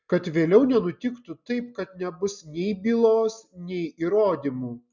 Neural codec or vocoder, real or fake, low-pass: none; real; 7.2 kHz